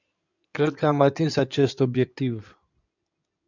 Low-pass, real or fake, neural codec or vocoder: 7.2 kHz; fake; codec, 16 kHz in and 24 kHz out, 2.2 kbps, FireRedTTS-2 codec